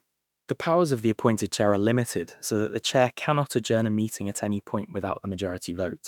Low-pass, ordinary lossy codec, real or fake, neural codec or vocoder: 19.8 kHz; none; fake; autoencoder, 48 kHz, 32 numbers a frame, DAC-VAE, trained on Japanese speech